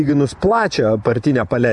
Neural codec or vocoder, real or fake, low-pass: vocoder, 24 kHz, 100 mel bands, Vocos; fake; 10.8 kHz